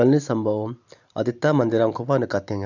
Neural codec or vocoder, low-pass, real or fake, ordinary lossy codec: none; 7.2 kHz; real; none